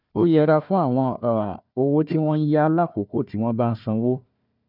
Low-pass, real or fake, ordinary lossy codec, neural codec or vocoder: 5.4 kHz; fake; none; codec, 16 kHz, 1 kbps, FunCodec, trained on Chinese and English, 50 frames a second